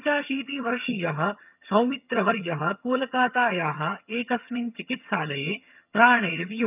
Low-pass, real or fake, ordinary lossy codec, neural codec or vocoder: 3.6 kHz; fake; none; vocoder, 22.05 kHz, 80 mel bands, HiFi-GAN